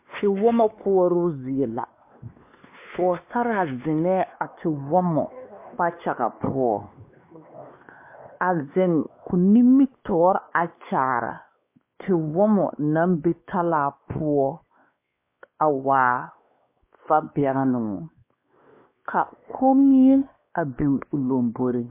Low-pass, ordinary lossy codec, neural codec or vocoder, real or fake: 3.6 kHz; MP3, 24 kbps; codec, 16 kHz, 2 kbps, X-Codec, WavLM features, trained on Multilingual LibriSpeech; fake